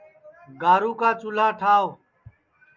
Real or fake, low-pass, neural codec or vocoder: real; 7.2 kHz; none